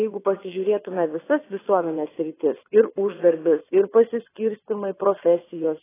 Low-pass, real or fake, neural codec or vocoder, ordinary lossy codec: 3.6 kHz; fake; vocoder, 22.05 kHz, 80 mel bands, WaveNeXt; AAC, 16 kbps